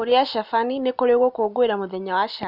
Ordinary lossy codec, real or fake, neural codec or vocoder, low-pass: none; real; none; 5.4 kHz